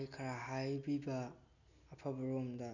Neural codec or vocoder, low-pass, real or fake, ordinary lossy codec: none; 7.2 kHz; real; none